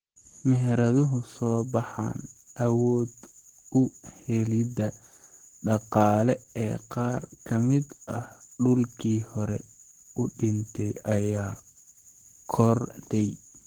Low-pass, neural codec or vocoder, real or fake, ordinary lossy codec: 19.8 kHz; codec, 44.1 kHz, 7.8 kbps, Pupu-Codec; fake; Opus, 16 kbps